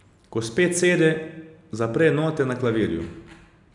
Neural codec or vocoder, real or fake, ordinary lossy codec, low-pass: none; real; none; 10.8 kHz